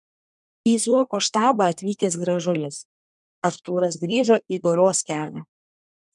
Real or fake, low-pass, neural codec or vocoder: fake; 10.8 kHz; codec, 24 kHz, 1 kbps, SNAC